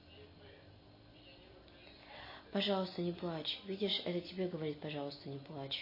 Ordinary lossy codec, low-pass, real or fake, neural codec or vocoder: MP3, 24 kbps; 5.4 kHz; real; none